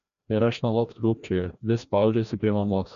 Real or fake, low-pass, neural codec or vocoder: fake; 7.2 kHz; codec, 16 kHz, 1 kbps, FreqCodec, larger model